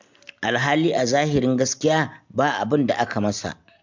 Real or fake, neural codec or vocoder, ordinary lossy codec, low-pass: real; none; MP3, 64 kbps; 7.2 kHz